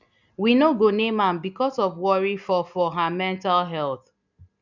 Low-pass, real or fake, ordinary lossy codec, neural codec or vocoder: 7.2 kHz; real; none; none